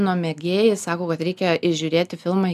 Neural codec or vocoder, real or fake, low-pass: none; real; 14.4 kHz